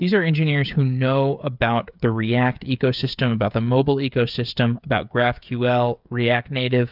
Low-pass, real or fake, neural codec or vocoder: 5.4 kHz; fake; codec, 16 kHz, 8 kbps, FreqCodec, smaller model